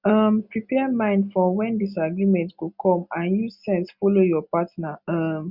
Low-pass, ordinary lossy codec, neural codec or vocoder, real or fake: 5.4 kHz; Opus, 64 kbps; none; real